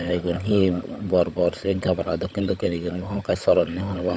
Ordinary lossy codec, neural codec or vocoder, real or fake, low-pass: none; codec, 16 kHz, 16 kbps, FunCodec, trained on LibriTTS, 50 frames a second; fake; none